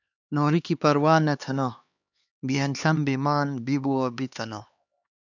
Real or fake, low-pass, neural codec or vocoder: fake; 7.2 kHz; codec, 16 kHz, 2 kbps, X-Codec, HuBERT features, trained on LibriSpeech